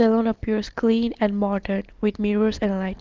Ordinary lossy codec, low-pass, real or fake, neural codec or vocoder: Opus, 16 kbps; 7.2 kHz; real; none